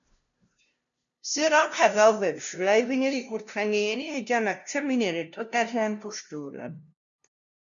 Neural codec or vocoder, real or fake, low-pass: codec, 16 kHz, 0.5 kbps, FunCodec, trained on LibriTTS, 25 frames a second; fake; 7.2 kHz